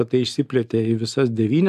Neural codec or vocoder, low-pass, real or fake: none; 14.4 kHz; real